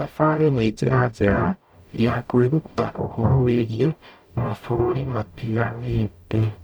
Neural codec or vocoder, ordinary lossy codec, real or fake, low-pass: codec, 44.1 kHz, 0.9 kbps, DAC; none; fake; none